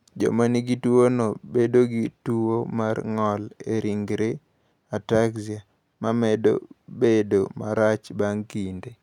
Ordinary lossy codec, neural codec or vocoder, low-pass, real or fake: none; none; 19.8 kHz; real